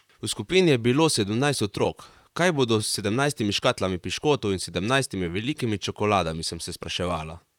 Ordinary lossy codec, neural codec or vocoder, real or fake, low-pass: none; vocoder, 44.1 kHz, 128 mel bands, Pupu-Vocoder; fake; 19.8 kHz